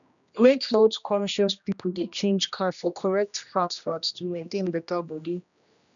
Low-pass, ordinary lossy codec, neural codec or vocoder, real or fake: 7.2 kHz; none; codec, 16 kHz, 1 kbps, X-Codec, HuBERT features, trained on general audio; fake